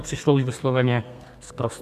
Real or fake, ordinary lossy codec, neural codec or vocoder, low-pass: fake; MP3, 96 kbps; codec, 32 kHz, 1.9 kbps, SNAC; 14.4 kHz